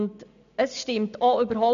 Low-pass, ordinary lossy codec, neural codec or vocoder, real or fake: 7.2 kHz; none; none; real